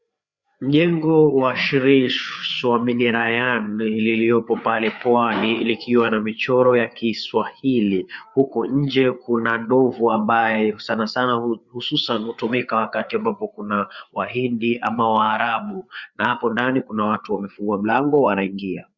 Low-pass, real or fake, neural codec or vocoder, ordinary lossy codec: 7.2 kHz; fake; codec, 16 kHz, 4 kbps, FreqCodec, larger model; Opus, 64 kbps